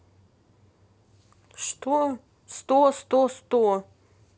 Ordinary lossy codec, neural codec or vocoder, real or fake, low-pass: none; none; real; none